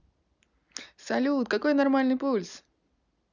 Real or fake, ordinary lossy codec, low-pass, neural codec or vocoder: real; none; 7.2 kHz; none